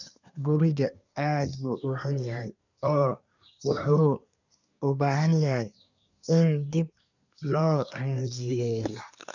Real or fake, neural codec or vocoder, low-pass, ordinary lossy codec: fake; codec, 24 kHz, 1 kbps, SNAC; 7.2 kHz; none